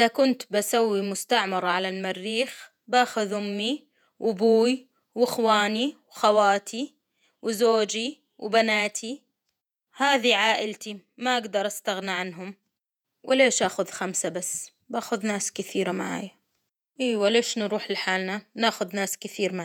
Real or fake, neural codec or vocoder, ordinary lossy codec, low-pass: fake; vocoder, 48 kHz, 128 mel bands, Vocos; none; 19.8 kHz